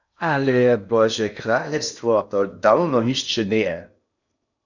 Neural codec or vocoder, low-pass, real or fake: codec, 16 kHz in and 24 kHz out, 0.8 kbps, FocalCodec, streaming, 65536 codes; 7.2 kHz; fake